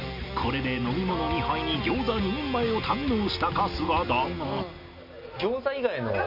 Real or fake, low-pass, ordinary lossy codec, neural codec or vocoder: real; 5.4 kHz; MP3, 48 kbps; none